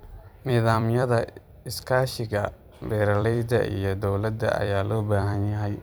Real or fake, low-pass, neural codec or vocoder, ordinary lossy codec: fake; none; vocoder, 44.1 kHz, 128 mel bands every 256 samples, BigVGAN v2; none